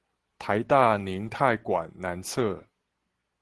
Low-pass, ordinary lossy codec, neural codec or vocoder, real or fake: 10.8 kHz; Opus, 16 kbps; none; real